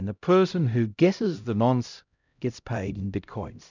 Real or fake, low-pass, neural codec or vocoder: fake; 7.2 kHz; codec, 16 kHz, 0.5 kbps, X-Codec, HuBERT features, trained on LibriSpeech